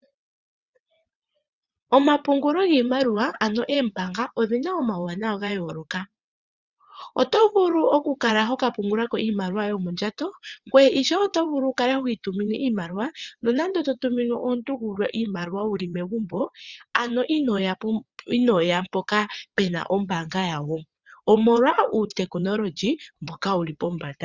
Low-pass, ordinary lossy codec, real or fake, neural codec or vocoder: 7.2 kHz; Opus, 64 kbps; fake; vocoder, 22.05 kHz, 80 mel bands, WaveNeXt